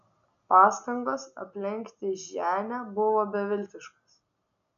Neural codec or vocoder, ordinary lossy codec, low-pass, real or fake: none; MP3, 96 kbps; 7.2 kHz; real